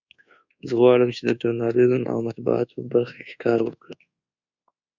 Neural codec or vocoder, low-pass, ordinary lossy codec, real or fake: codec, 24 kHz, 1.2 kbps, DualCodec; 7.2 kHz; Opus, 64 kbps; fake